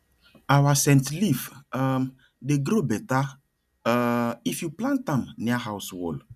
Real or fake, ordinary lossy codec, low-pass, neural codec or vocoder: real; none; 14.4 kHz; none